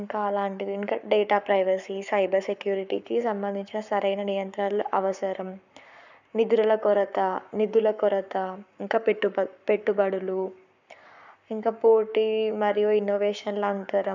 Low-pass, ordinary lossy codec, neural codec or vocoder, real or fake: 7.2 kHz; none; codec, 44.1 kHz, 7.8 kbps, Pupu-Codec; fake